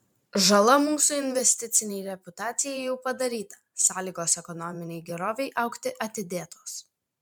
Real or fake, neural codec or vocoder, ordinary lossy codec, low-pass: fake; vocoder, 44.1 kHz, 128 mel bands every 512 samples, BigVGAN v2; MP3, 96 kbps; 19.8 kHz